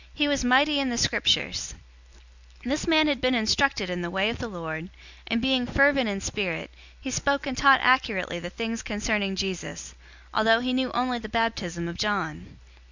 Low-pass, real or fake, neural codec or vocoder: 7.2 kHz; real; none